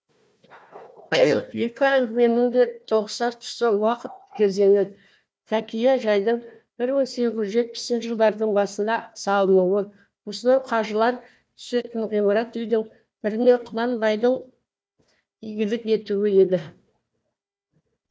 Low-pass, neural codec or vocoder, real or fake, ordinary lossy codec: none; codec, 16 kHz, 1 kbps, FunCodec, trained on Chinese and English, 50 frames a second; fake; none